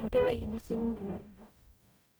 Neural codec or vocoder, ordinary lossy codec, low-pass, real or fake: codec, 44.1 kHz, 0.9 kbps, DAC; none; none; fake